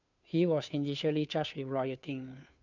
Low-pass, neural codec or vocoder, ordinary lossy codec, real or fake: 7.2 kHz; codec, 16 kHz, 2 kbps, FunCodec, trained on Chinese and English, 25 frames a second; none; fake